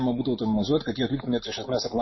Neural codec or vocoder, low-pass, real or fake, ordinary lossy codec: none; 7.2 kHz; real; MP3, 24 kbps